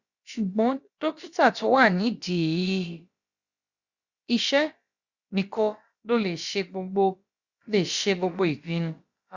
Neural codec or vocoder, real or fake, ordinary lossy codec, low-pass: codec, 16 kHz, about 1 kbps, DyCAST, with the encoder's durations; fake; Opus, 64 kbps; 7.2 kHz